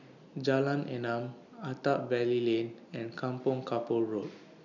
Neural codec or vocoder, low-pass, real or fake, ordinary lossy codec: none; 7.2 kHz; real; none